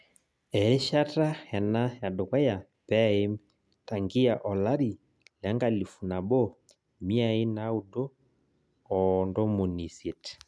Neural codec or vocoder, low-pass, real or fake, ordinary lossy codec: none; none; real; none